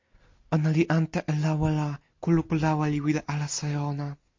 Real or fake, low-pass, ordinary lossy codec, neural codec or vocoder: real; 7.2 kHz; MP3, 48 kbps; none